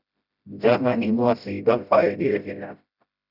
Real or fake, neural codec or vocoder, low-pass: fake; codec, 16 kHz, 0.5 kbps, FreqCodec, smaller model; 5.4 kHz